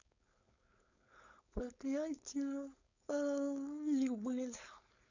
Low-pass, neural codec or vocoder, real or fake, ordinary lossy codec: 7.2 kHz; codec, 16 kHz, 4.8 kbps, FACodec; fake; none